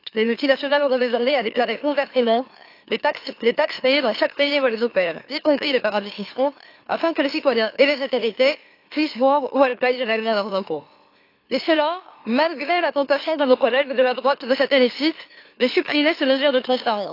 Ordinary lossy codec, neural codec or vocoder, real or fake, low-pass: AAC, 32 kbps; autoencoder, 44.1 kHz, a latent of 192 numbers a frame, MeloTTS; fake; 5.4 kHz